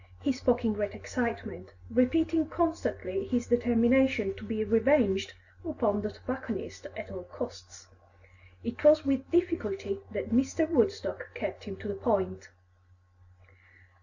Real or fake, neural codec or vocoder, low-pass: real; none; 7.2 kHz